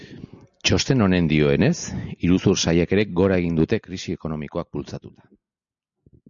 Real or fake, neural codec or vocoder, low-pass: real; none; 7.2 kHz